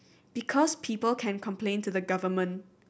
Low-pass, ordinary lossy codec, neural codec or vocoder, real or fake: none; none; none; real